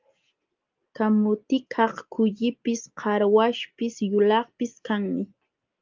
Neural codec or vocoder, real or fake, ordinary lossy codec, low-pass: none; real; Opus, 32 kbps; 7.2 kHz